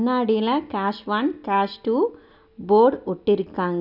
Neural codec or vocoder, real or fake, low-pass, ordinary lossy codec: none; real; 5.4 kHz; none